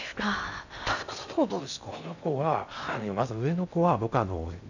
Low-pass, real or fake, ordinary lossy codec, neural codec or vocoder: 7.2 kHz; fake; none; codec, 16 kHz in and 24 kHz out, 0.6 kbps, FocalCodec, streaming, 2048 codes